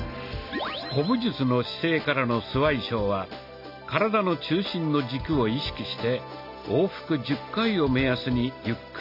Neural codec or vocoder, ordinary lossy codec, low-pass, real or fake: none; none; 5.4 kHz; real